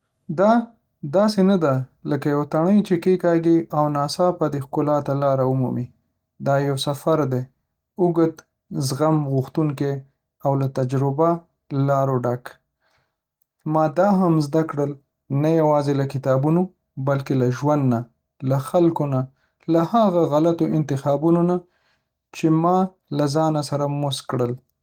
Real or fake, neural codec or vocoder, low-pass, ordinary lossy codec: real; none; 19.8 kHz; Opus, 24 kbps